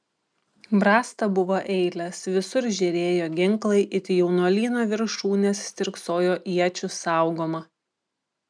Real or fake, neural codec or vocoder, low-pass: real; none; 9.9 kHz